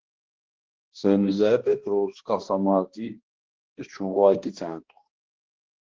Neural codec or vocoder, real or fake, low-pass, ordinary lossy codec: codec, 16 kHz, 1 kbps, X-Codec, HuBERT features, trained on balanced general audio; fake; 7.2 kHz; Opus, 16 kbps